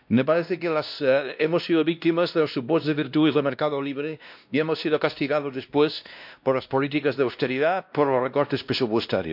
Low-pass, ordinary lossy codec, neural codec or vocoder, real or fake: 5.4 kHz; MP3, 48 kbps; codec, 16 kHz, 1 kbps, X-Codec, WavLM features, trained on Multilingual LibriSpeech; fake